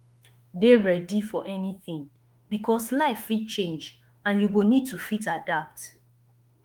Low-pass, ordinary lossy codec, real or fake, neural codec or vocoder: 19.8 kHz; Opus, 32 kbps; fake; autoencoder, 48 kHz, 32 numbers a frame, DAC-VAE, trained on Japanese speech